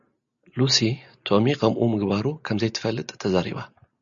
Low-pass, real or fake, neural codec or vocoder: 7.2 kHz; real; none